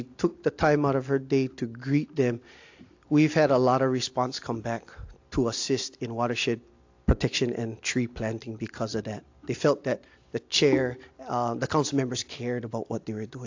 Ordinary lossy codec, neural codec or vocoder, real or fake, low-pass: AAC, 48 kbps; none; real; 7.2 kHz